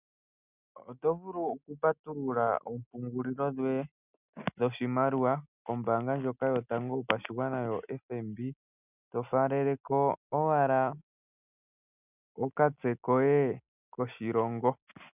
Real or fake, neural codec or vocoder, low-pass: real; none; 3.6 kHz